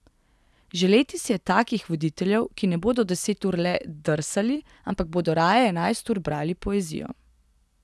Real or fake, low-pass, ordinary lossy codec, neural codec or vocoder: real; none; none; none